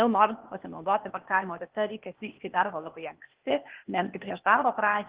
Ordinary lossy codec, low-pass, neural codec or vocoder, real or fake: Opus, 16 kbps; 3.6 kHz; codec, 16 kHz, 0.8 kbps, ZipCodec; fake